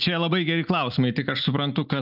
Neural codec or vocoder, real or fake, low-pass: none; real; 5.4 kHz